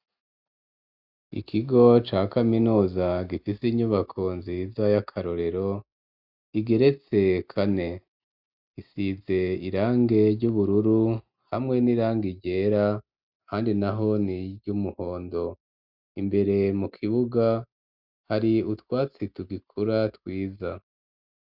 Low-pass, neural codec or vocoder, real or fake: 5.4 kHz; none; real